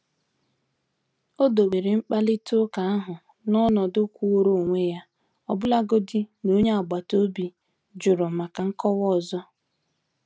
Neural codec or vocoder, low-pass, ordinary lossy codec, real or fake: none; none; none; real